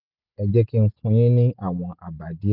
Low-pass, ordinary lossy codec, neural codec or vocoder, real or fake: 5.4 kHz; none; none; real